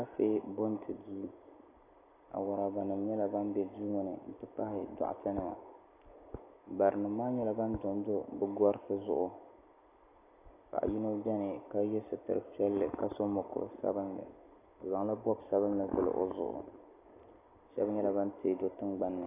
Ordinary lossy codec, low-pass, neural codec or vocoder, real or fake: AAC, 24 kbps; 3.6 kHz; none; real